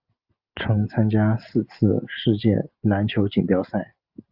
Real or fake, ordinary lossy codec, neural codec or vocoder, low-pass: real; Opus, 32 kbps; none; 5.4 kHz